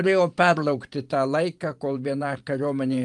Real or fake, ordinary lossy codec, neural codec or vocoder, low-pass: fake; Opus, 32 kbps; vocoder, 44.1 kHz, 128 mel bands every 512 samples, BigVGAN v2; 10.8 kHz